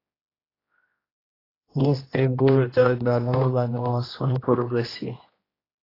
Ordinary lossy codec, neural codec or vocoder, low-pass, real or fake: AAC, 24 kbps; codec, 16 kHz, 1 kbps, X-Codec, HuBERT features, trained on general audio; 5.4 kHz; fake